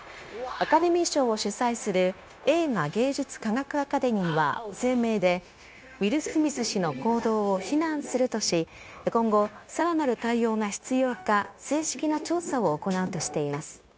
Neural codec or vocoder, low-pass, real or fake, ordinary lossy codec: codec, 16 kHz, 0.9 kbps, LongCat-Audio-Codec; none; fake; none